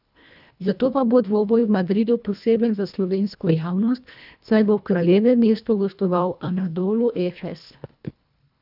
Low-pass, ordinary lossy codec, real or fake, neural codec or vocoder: 5.4 kHz; none; fake; codec, 24 kHz, 1.5 kbps, HILCodec